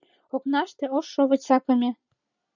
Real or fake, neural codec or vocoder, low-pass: real; none; 7.2 kHz